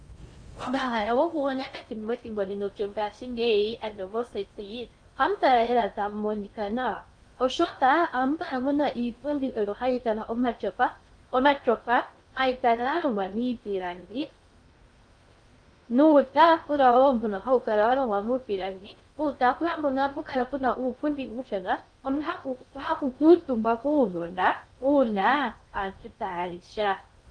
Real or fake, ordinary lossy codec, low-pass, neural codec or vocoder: fake; Opus, 32 kbps; 9.9 kHz; codec, 16 kHz in and 24 kHz out, 0.6 kbps, FocalCodec, streaming, 4096 codes